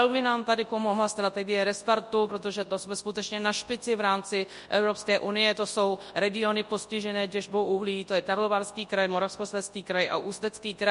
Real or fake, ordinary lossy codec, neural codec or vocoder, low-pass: fake; MP3, 48 kbps; codec, 24 kHz, 0.9 kbps, WavTokenizer, large speech release; 10.8 kHz